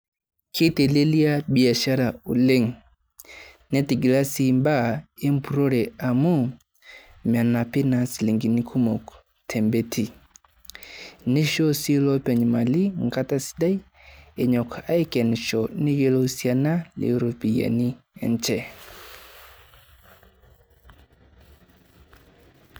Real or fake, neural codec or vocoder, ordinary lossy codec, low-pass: real; none; none; none